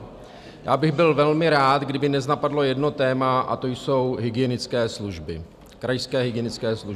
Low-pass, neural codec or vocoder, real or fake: 14.4 kHz; none; real